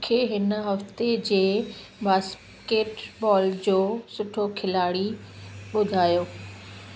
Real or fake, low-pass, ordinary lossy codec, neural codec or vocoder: real; none; none; none